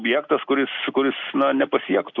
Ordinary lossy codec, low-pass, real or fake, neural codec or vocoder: Opus, 64 kbps; 7.2 kHz; real; none